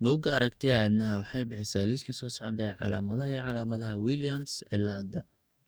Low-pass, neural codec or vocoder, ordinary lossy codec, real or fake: none; codec, 44.1 kHz, 2.6 kbps, DAC; none; fake